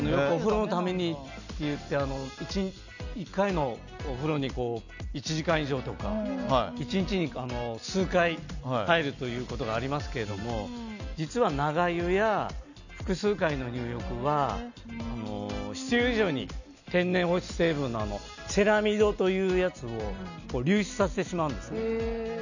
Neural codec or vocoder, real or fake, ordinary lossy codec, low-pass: none; real; none; 7.2 kHz